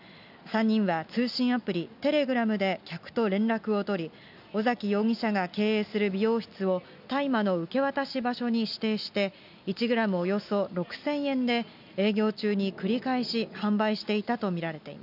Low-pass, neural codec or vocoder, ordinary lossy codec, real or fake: 5.4 kHz; none; none; real